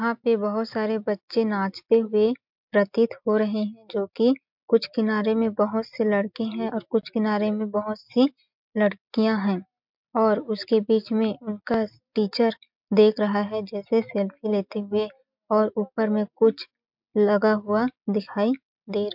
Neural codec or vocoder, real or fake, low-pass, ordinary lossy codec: none; real; 5.4 kHz; MP3, 48 kbps